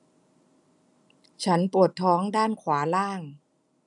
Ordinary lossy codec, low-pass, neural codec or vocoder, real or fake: none; 10.8 kHz; none; real